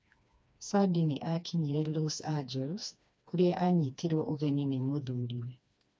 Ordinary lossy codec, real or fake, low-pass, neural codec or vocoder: none; fake; none; codec, 16 kHz, 2 kbps, FreqCodec, smaller model